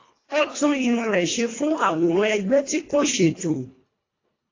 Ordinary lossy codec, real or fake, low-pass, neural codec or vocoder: AAC, 32 kbps; fake; 7.2 kHz; codec, 24 kHz, 1.5 kbps, HILCodec